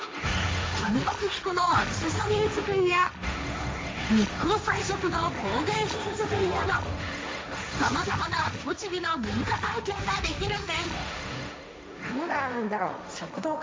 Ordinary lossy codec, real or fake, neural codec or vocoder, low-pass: none; fake; codec, 16 kHz, 1.1 kbps, Voila-Tokenizer; none